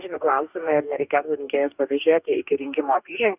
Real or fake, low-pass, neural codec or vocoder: fake; 3.6 kHz; codec, 44.1 kHz, 2.6 kbps, DAC